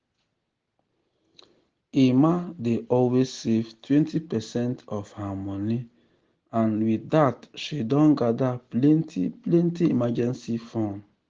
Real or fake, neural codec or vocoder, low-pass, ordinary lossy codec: real; none; 7.2 kHz; Opus, 16 kbps